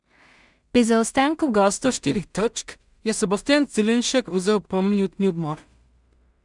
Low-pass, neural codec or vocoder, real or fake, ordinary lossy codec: 10.8 kHz; codec, 16 kHz in and 24 kHz out, 0.4 kbps, LongCat-Audio-Codec, two codebook decoder; fake; none